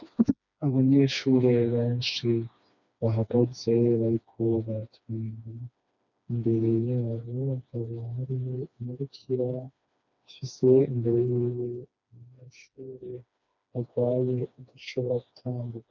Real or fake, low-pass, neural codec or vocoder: fake; 7.2 kHz; codec, 16 kHz, 2 kbps, FreqCodec, smaller model